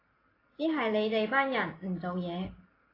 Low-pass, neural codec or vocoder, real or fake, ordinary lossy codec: 5.4 kHz; none; real; AAC, 24 kbps